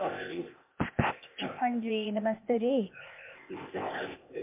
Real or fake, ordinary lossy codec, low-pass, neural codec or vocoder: fake; MP3, 32 kbps; 3.6 kHz; codec, 16 kHz, 0.8 kbps, ZipCodec